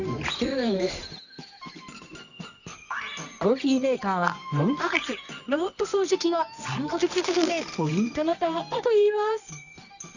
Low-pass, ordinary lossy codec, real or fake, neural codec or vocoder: 7.2 kHz; none; fake; codec, 24 kHz, 0.9 kbps, WavTokenizer, medium music audio release